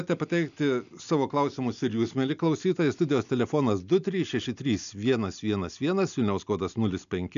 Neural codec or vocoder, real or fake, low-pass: none; real; 7.2 kHz